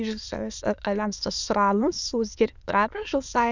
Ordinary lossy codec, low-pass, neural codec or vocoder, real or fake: none; 7.2 kHz; autoencoder, 22.05 kHz, a latent of 192 numbers a frame, VITS, trained on many speakers; fake